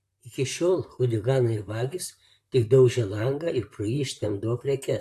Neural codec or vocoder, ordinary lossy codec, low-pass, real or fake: vocoder, 44.1 kHz, 128 mel bands, Pupu-Vocoder; AAC, 64 kbps; 14.4 kHz; fake